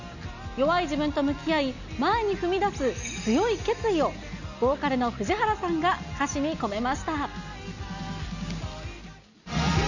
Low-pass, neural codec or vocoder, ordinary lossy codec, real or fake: 7.2 kHz; none; none; real